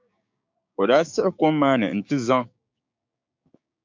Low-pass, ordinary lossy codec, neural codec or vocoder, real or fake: 7.2 kHz; MP3, 48 kbps; codec, 16 kHz, 6 kbps, DAC; fake